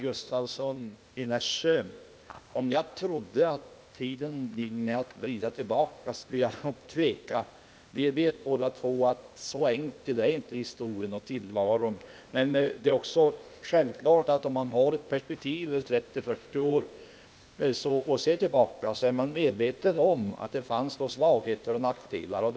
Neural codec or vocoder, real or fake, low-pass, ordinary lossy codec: codec, 16 kHz, 0.8 kbps, ZipCodec; fake; none; none